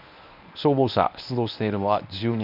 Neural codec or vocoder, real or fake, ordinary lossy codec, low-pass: codec, 24 kHz, 0.9 kbps, WavTokenizer, medium speech release version 1; fake; none; 5.4 kHz